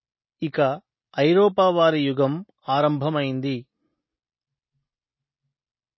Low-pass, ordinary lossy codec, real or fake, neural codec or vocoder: 7.2 kHz; MP3, 24 kbps; real; none